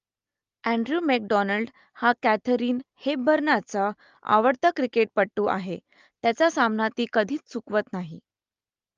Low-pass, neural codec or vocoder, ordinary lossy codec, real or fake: 7.2 kHz; none; Opus, 32 kbps; real